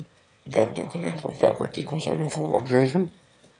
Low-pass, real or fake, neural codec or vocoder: 9.9 kHz; fake; autoencoder, 22.05 kHz, a latent of 192 numbers a frame, VITS, trained on one speaker